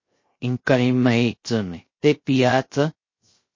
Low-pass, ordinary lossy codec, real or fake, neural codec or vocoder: 7.2 kHz; MP3, 32 kbps; fake; codec, 16 kHz, 0.3 kbps, FocalCodec